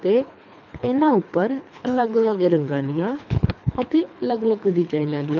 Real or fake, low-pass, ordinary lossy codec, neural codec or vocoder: fake; 7.2 kHz; none; codec, 24 kHz, 3 kbps, HILCodec